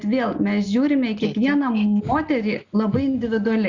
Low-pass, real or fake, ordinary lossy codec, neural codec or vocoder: 7.2 kHz; real; Opus, 64 kbps; none